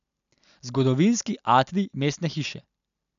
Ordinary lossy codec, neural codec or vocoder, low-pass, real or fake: none; none; 7.2 kHz; real